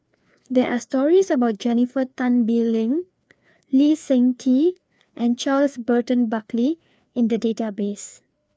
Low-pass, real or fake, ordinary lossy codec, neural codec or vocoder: none; fake; none; codec, 16 kHz, 2 kbps, FreqCodec, larger model